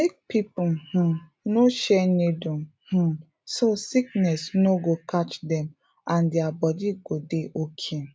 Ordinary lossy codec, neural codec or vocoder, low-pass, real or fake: none; none; none; real